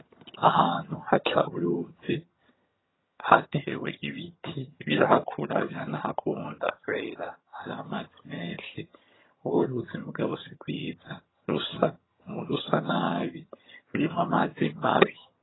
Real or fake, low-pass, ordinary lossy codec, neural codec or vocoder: fake; 7.2 kHz; AAC, 16 kbps; vocoder, 22.05 kHz, 80 mel bands, HiFi-GAN